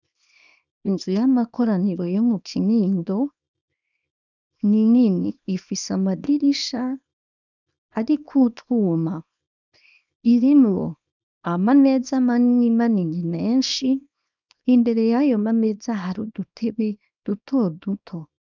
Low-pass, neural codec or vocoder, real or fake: 7.2 kHz; codec, 24 kHz, 0.9 kbps, WavTokenizer, small release; fake